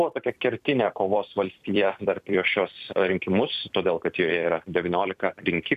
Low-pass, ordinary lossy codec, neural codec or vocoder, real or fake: 14.4 kHz; MP3, 96 kbps; vocoder, 44.1 kHz, 128 mel bands every 256 samples, BigVGAN v2; fake